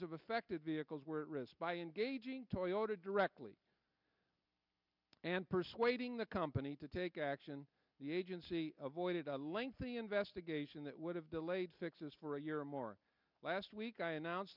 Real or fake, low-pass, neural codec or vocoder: real; 5.4 kHz; none